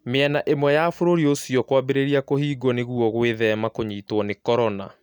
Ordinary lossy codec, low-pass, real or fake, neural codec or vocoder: none; 19.8 kHz; real; none